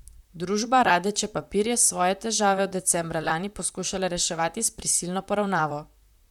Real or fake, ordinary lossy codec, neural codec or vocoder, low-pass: fake; none; vocoder, 44.1 kHz, 128 mel bands, Pupu-Vocoder; 19.8 kHz